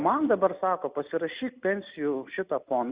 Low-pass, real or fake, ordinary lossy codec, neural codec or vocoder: 3.6 kHz; real; Opus, 64 kbps; none